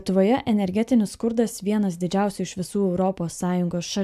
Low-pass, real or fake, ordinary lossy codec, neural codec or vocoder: 14.4 kHz; real; AAC, 96 kbps; none